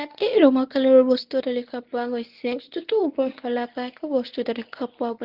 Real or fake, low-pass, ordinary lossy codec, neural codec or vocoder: fake; 5.4 kHz; Opus, 32 kbps; codec, 24 kHz, 0.9 kbps, WavTokenizer, medium speech release version 1